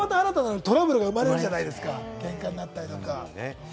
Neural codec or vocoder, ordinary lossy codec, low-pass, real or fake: none; none; none; real